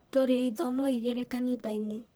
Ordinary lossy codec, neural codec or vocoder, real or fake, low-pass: none; codec, 44.1 kHz, 1.7 kbps, Pupu-Codec; fake; none